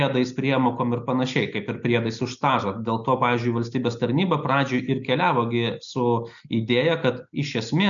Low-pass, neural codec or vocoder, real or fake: 7.2 kHz; none; real